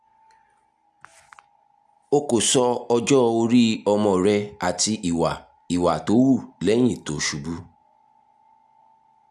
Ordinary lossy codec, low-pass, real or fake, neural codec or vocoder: none; none; real; none